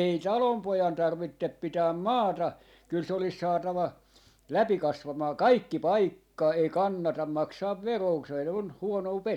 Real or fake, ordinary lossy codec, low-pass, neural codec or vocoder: real; none; 19.8 kHz; none